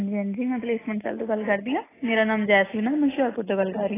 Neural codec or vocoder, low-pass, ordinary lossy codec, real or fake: none; 3.6 kHz; AAC, 16 kbps; real